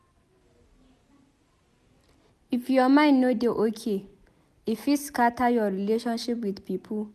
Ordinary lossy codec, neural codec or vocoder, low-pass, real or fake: none; none; 14.4 kHz; real